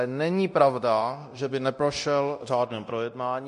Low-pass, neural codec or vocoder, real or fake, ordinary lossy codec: 10.8 kHz; codec, 24 kHz, 0.9 kbps, DualCodec; fake; MP3, 48 kbps